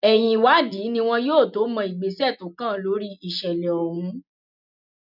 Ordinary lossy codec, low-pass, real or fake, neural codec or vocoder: none; 5.4 kHz; real; none